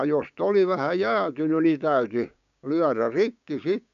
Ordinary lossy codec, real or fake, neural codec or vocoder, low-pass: none; fake; codec, 16 kHz, 6 kbps, DAC; 7.2 kHz